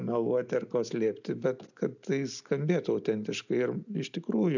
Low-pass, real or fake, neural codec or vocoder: 7.2 kHz; real; none